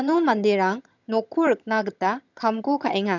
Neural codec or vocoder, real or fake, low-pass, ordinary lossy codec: vocoder, 22.05 kHz, 80 mel bands, HiFi-GAN; fake; 7.2 kHz; none